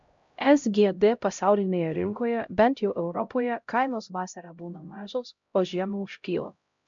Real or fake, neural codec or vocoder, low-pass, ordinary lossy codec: fake; codec, 16 kHz, 0.5 kbps, X-Codec, HuBERT features, trained on LibriSpeech; 7.2 kHz; MP3, 64 kbps